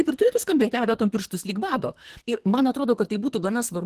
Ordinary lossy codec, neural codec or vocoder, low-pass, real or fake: Opus, 16 kbps; codec, 44.1 kHz, 2.6 kbps, SNAC; 14.4 kHz; fake